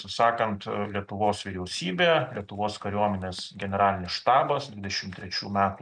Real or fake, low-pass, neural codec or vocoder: real; 9.9 kHz; none